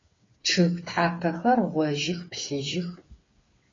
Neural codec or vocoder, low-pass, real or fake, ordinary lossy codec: codec, 16 kHz, 8 kbps, FreqCodec, smaller model; 7.2 kHz; fake; AAC, 32 kbps